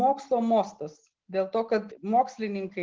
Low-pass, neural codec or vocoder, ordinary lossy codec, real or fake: 7.2 kHz; none; Opus, 16 kbps; real